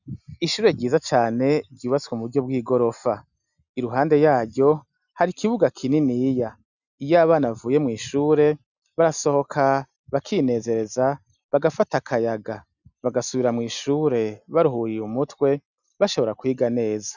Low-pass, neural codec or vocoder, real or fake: 7.2 kHz; none; real